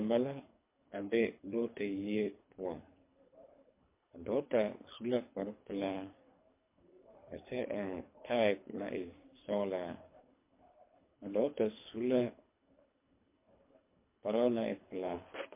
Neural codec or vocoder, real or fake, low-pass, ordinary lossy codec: codec, 24 kHz, 3 kbps, HILCodec; fake; 3.6 kHz; MP3, 24 kbps